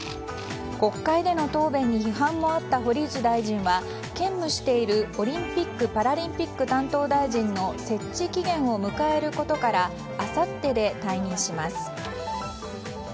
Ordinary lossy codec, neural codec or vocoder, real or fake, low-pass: none; none; real; none